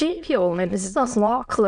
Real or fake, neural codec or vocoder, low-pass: fake; autoencoder, 22.05 kHz, a latent of 192 numbers a frame, VITS, trained on many speakers; 9.9 kHz